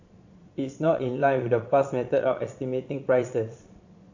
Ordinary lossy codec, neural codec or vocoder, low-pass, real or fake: AAC, 48 kbps; vocoder, 22.05 kHz, 80 mel bands, Vocos; 7.2 kHz; fake